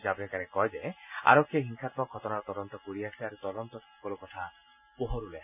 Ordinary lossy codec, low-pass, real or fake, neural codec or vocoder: none; 3.6 kHz; real; none